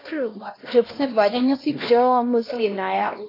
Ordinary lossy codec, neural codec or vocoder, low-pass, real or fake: AAC, 24 kbps; codec, 16 kHz, 1 kbps, X-Codec, HuBERT features, trained on LibriSpeech; 5.4 kHz; fake